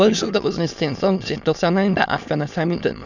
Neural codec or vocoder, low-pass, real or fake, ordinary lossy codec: autoencoder, 22.05 kHz, a latent of 192 numbers a frame, VITS, trained on many speakers; 7.2 kHz; fake; none